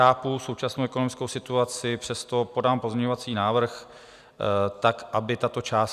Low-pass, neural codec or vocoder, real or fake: 14.4 kHz; none; real